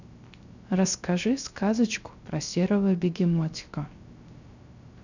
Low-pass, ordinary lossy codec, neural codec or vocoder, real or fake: 7.2 kHz; none; codec, 16 kHz, 0.3 kbps, FocalCodec; fake